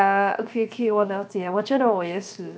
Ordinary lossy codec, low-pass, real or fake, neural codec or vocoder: none; none; fake; codec, 16 kHz, 0.7 kbps, FocalCodec